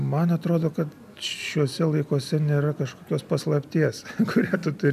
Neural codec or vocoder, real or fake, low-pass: none; real; 14.4 kHz